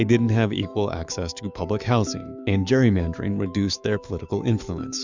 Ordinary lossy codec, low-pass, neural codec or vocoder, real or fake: Opus, 64 kbps; 7.2 kHz; autoencoder, 48 kHz, 128 numbers a frame, DAC-VAE, trained on Japanese speech; fake